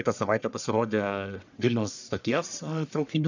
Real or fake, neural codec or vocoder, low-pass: fake; codec, 44.1 kHz, 1.7 kbps, Pupu-Codec; 7.2 kHz